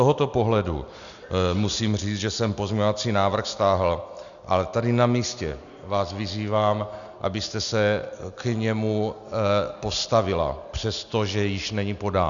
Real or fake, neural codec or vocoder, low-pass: real; none; 7.2 kHz